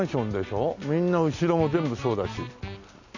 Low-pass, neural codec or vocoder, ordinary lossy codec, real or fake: 7.2 kHz; none; none; real